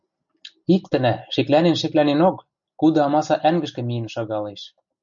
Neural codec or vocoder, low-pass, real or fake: none; 7.2 kHz; real